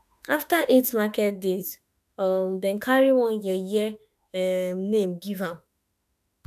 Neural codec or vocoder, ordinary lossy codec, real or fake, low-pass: autoencoder, 48 kHz, 32 numbers a frame, DAC-VAE, trained on Japanese speech; none; fake; 14.4 kHz